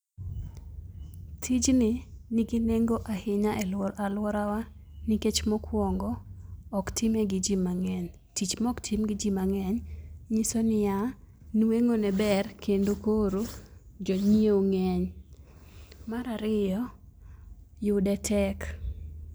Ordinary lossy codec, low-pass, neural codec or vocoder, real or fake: none; none; none; real